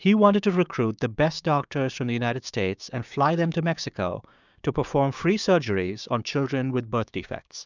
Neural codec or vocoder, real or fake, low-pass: codec, 16 kHz, 6 kbps, DAC; fake; 7.2 kHz